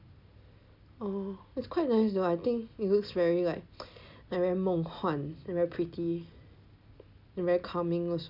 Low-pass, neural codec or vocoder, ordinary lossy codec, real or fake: 5.4 kHz; none; none; real